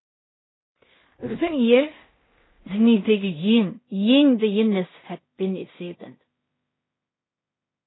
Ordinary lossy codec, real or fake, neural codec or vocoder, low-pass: AAC, 16 kbps; fake; codec, 16 kHz in and 24 kHz out, 0.4 kbps, LongCat-Audio-Codec, two codebook decoder; 7.2 kHz